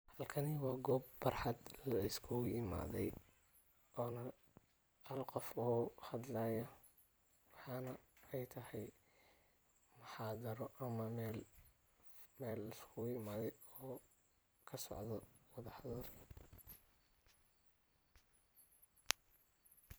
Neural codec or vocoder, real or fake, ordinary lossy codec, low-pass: vocoder, 44.1 kHz, 128 mel bands every 256 samples, BigVGAN v2; fake; none; none